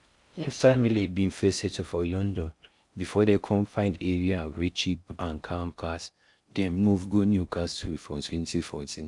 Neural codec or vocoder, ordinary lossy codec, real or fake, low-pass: codec, 16 kHz in and 24 kHz out, 0.6 kbps, FocalCodec, streaming, 4096 codes; none; fake; 10.8 kHz